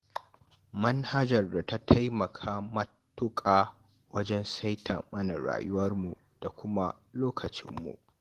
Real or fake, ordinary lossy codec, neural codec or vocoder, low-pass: real; Opus, 16 kbps; none; 14.4 kHz